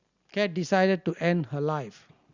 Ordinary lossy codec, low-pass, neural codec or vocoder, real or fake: Opus, 64 kbps; 7.2 kHz; none; real